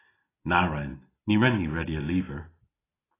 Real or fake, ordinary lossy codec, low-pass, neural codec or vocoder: real; AAC, 16 kbps; 3.6 kHz; none